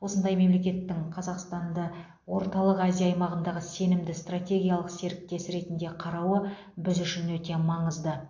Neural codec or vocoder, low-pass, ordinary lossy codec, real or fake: none; 7.2 kHz; AAC, 48 kbps; real